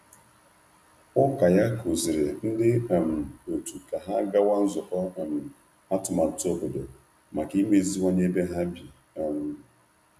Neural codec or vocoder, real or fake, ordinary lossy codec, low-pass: none; real; none; 14.4 kHz